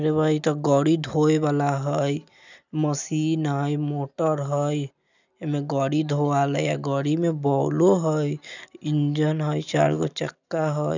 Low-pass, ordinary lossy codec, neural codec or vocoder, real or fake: 7.2 kHz; none; none; real